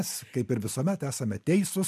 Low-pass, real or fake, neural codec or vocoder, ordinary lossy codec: 14.4 kHz; real; none; MP3, 96 kbps